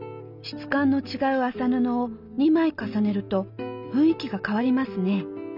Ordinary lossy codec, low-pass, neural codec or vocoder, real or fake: none; 5.4 kHz; none; real